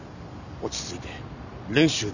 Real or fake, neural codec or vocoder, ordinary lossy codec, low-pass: real; none; none; 7.2 kHz